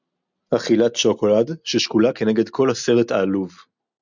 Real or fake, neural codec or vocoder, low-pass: real; none; 7.2 kHz